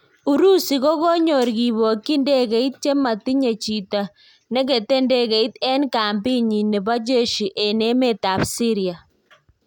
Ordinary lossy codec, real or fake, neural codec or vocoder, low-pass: none; real; none; 19.8 kHz